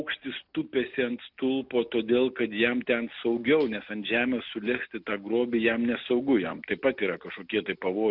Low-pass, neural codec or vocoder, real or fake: 5.4 kHz; none; real